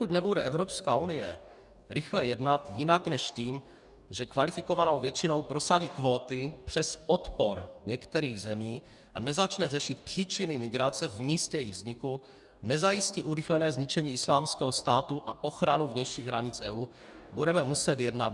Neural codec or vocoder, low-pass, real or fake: codec, 44.1 kHz, 2.6 kbps, DAC; 10.8 kHz; fake